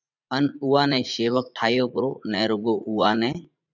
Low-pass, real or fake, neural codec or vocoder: 7.2 kHz; fake; vocoder, 44.1 kHz, 80 mel bands, Vocos